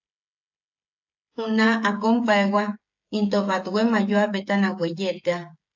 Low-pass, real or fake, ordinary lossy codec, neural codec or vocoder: 7.2 kHz; fake; AAC, 48 kbps; codec, 16 kHz, 16 kbps, FreqCodec, smaller model